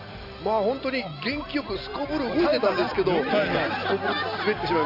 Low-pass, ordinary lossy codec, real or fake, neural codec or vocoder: 5.4 kHz; none; real; none